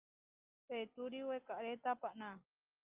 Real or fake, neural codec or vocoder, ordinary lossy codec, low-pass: real; none; Opus, 24 kbps; 3.6 kHz